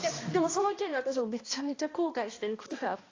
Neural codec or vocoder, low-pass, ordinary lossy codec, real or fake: codec, 16 kHz, 1 kbps, X-Codec, HuBERT features, trained on balanced general audio; 7.2 kHz; AAC, 32 kbps; fake